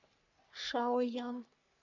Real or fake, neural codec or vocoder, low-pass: fake; codec, 44.1 kHz, 3.4 kbps, Pupu-Codec; 7.2 kHz